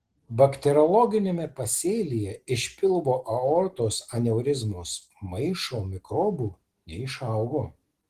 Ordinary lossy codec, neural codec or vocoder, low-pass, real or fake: Opus, 16 kbps; none; 14.4 kHz; real